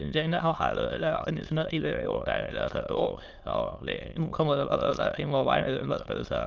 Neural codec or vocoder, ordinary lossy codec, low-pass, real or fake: autoencoder, 22.05 kHz, a latent of 192 numbers a frame, VITS, trained on many speakers; Opus, 32 kbps; 7.2 kHz; fake